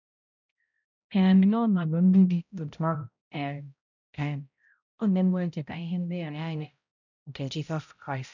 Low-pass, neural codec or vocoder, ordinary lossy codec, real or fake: 7.2 kHz; codec, 16 kHz, 0.5 kbps, X-Codec, HuBERT features, trained on balanced general audio; none; fake